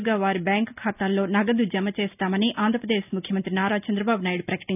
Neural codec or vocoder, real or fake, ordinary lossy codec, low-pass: none; real; none; 3.6 kHz